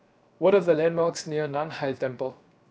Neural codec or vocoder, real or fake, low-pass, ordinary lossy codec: codec, 16 kHz, 0.7 kbps, FocalCodec; fake; none; none